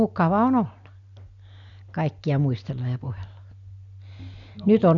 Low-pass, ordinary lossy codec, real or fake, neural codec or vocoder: 7.2 kHz; none; real; none